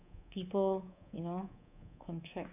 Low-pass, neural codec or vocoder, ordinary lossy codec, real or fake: 3.6 kHz; codec, 24 kHz, 3.1 kbps, DualCodec; none; fake